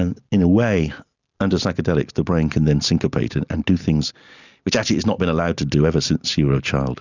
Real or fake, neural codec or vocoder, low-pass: real; none; 7.2 kHz